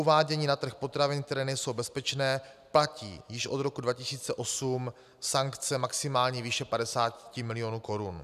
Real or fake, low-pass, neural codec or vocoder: real; 14.4 kHz; none